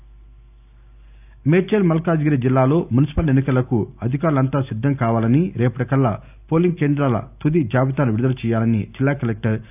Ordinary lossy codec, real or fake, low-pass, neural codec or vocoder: none; real; 3.6 kHz; none